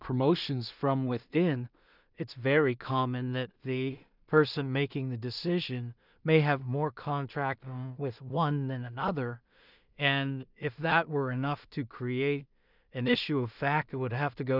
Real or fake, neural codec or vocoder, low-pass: fake; codec, 16 kHz in and 24 kHz out, 0.4 kbps, LongCat-Audio-Codec, two codebook decoder; 5.4 kHz